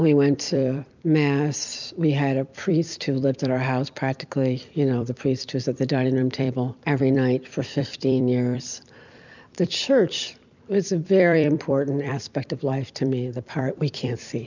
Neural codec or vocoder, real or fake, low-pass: vocoder, 44.1 kHz, 128 mel bands every 256 samples, BigVGAN v2; fake; 7.2 kHz